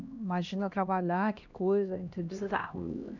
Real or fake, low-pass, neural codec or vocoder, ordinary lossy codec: fake; 7.2 kHz; codec, 16 kHz, 1 kbps, X-Codec, HuBERT features, trained on LibriSpeech; none